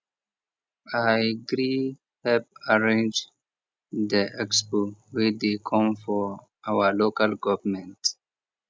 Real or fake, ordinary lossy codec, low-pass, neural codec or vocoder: real; none; none; none